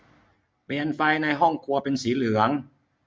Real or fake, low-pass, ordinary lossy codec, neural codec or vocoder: real; none; none; none